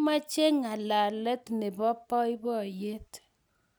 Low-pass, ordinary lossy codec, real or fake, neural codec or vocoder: none; none; real; none